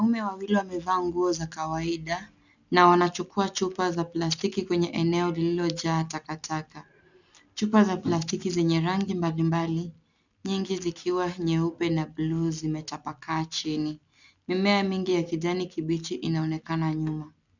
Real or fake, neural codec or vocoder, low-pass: real; none; 7.2 kHz